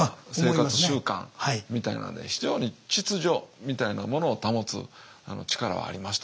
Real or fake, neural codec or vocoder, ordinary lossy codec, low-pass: real; none; none; none